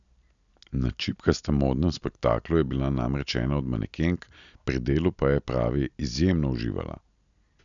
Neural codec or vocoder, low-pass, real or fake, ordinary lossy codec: none; 7.2 kHz; real; none